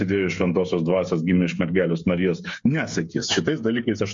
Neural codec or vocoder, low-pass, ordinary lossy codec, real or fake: codec, 16 kHz, 8 kbps, FreqCodec, smaller model; 7.2 kHz; MP3, 48 kbps; fake